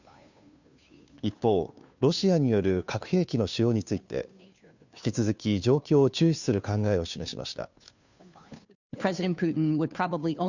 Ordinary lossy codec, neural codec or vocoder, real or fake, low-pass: none; codec, 16 kHz, 2 kbps, FunCodec, trained on Chinese and English, 25 frames a second; fake; 7.2 kHz